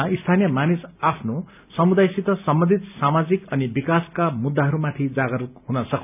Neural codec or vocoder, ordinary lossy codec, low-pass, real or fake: none; none; 3.6 kHz; real